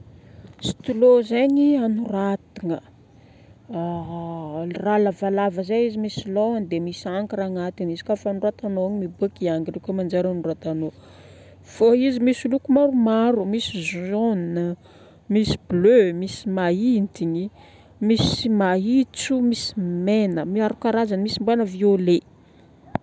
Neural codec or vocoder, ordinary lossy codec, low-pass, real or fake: none; none; none; real